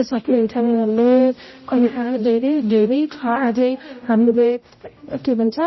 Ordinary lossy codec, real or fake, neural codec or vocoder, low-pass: MP3, 24 kbps; fake; codec, 16 kHz, 0.5 kbps, X-Codec, HuBERT features, trained on general audio; 7.2 kHz